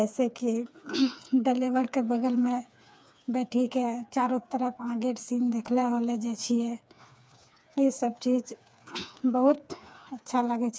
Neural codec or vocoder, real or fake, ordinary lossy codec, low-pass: codec, 16 kHz, 4 kbps, FreqCodec, smaller model; fake; none; none